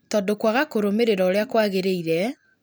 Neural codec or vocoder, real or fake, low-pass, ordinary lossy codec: none; real; none; none